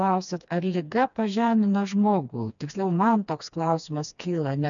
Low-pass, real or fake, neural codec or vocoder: 7.2 kHz; fake; codec, 16 kHz, 2 kbps, FreqCodec, smaller model